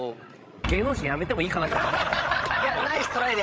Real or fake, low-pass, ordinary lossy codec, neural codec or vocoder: fake; none; none; codec, 16 kHz, 16 kbps, FreqCodec, larger model